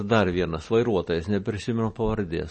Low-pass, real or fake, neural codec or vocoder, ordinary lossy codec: 10.8 kHz; real; none; MP3, 32 kbps